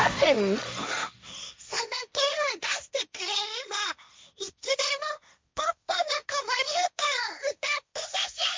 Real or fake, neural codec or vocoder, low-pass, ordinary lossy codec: fake; codec, 16 kHz, 1.1 kbps, Voila-Tokenizer; none; none